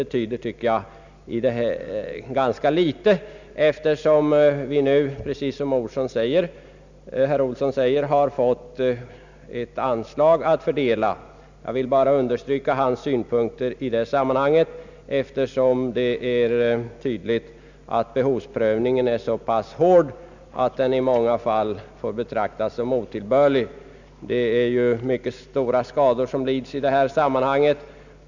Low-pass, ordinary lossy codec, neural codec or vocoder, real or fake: 7.2 kHz; none; none; real